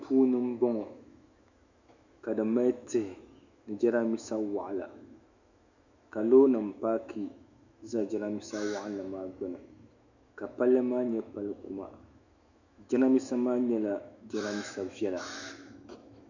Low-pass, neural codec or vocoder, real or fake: 7.2 kHz; autoencoder, 48 kHz, 128 numbers a frame, DAC-VAE, trained on Japanese speech; fake